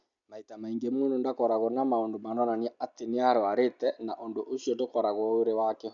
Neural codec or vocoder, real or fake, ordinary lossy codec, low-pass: none; real; none; 7.2 kHz